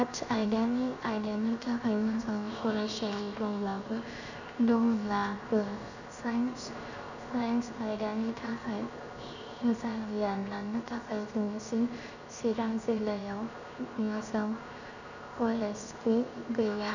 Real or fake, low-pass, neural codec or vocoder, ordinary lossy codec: fake; 7.2 kHz; codec, 16 kHz, 0.7 kbps, FocalCodec; none